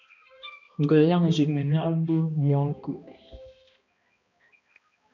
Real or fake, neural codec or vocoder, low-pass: fake; codec, 16 kHz, 1 kbps, X-Codec, HuBERT features, trained on balanced general audio; 7.2 kHz